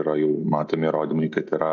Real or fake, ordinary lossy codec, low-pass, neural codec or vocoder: real; AAC, 48 kbps; 7.2 kHz; none